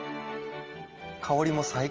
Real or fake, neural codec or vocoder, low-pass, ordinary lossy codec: real; none; 7.2 kHz; Opus, 24 kbps